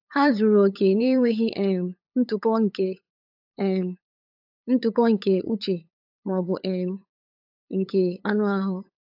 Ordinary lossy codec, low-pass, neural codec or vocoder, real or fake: AAC, 48 kbps; 5.4 kHz; codec, 16 kHz, 8 kbps, FunCodec, trained on LibriTTS, 25 frames a second; fake